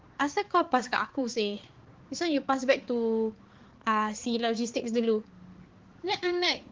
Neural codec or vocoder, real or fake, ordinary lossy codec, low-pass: codec, 16 kHz, 4 kbps, X-Codec, HuBERT features, trained on balanced general audio; fake; Opus, 16 kbps; 7.2 kHz